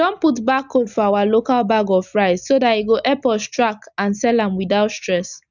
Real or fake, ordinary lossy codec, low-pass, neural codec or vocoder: real; none; 7.2 kHz; none